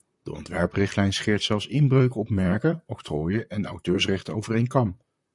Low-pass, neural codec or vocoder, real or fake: 10.8 kHz; vocoder, 44.1 kHz, 128 mel bands, Pupu-Vocoder; fake